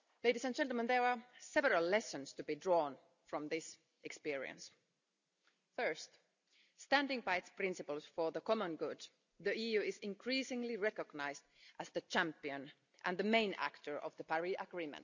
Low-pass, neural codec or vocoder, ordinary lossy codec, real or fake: 7.2 kHz; none; none; real